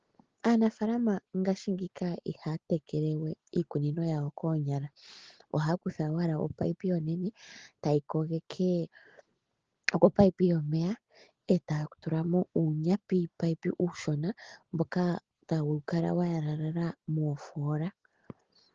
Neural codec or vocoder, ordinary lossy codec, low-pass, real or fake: none; Opus, 16 kbps; 7.2 kHz; real